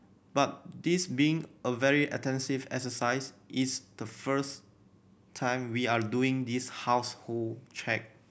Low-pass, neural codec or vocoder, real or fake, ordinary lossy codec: none; none; real; none